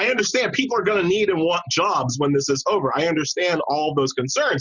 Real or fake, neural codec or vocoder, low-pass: real; none; 7.2 kHz